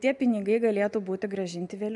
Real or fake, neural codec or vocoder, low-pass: real; none; 10.8 kHz